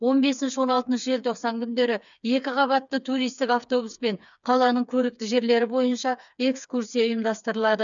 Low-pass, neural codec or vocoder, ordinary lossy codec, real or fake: 7.2 kHz; codec, 16 kHz, 4 kbps, FreqCodec, smaller model; MP3, 96 kbps; fake